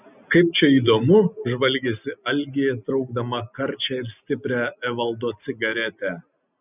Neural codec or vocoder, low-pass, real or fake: none; 3.6 kHz; real